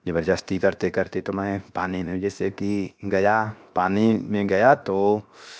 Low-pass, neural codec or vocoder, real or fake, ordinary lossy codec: none; codec, 16 kHz, 0.7 kbps, FocalCodec; fake; none